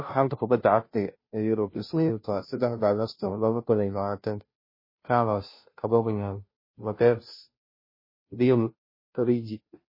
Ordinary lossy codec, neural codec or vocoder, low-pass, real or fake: MP3, 24 kbps; codec, 16 kHz, 0.5 kbps, FunCodec, trained on Chinese and English, 25 frames a second; 5.4 kHz; fake